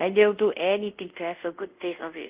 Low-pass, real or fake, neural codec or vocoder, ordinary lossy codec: 3.6 kHz; fake; codec, 24 kHz, 0.5 kbps, DualCodec; Opus, 32 kbps